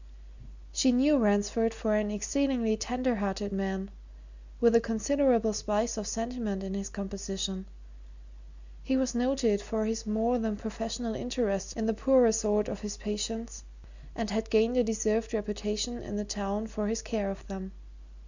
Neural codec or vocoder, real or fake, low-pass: none; real; 7.2 kHz